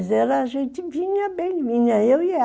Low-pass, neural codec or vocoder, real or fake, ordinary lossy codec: none; none; real; none